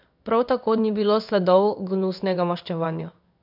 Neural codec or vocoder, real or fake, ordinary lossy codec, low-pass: codec, 16 kHz in and 24 kHz out, 1 kbps, XY-Tokenizer; fake; none; 5.4 kHz